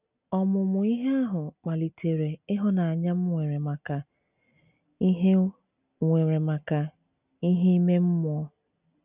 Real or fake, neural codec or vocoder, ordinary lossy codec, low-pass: real; none; none; 3.6 kHz